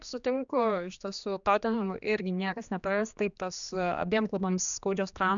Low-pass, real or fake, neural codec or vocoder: 7.2 kHz; fake; codec, 16 kHz, 2 kbps, X-Codec, HuBERT features, trained on general audio